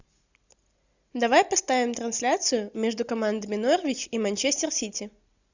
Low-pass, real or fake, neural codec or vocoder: 7.2 kHz; real; none